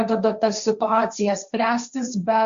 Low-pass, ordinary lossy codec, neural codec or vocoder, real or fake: 7.2 kHz; Opus, 64 kbps; codec, 16 kHz, 1.1 kbps, Voila-Tokenizer; fake